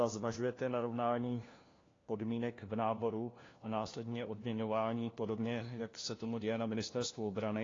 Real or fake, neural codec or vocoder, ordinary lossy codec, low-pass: fake; codec, 16 kHz, 1 kbps, FunCodec, trained on LibriTTS, 50 frames a second; AAC, 32 kbps; 7.2 kHz